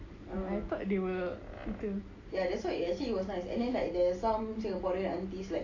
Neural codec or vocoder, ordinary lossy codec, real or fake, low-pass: none; AAC, 32 kbps; real; 7.2 kHz